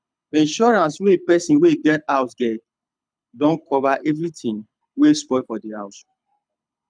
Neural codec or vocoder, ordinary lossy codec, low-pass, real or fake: codec, 24 kHz, 6 kbps, HILCodec; none; 9.9 kHz; fake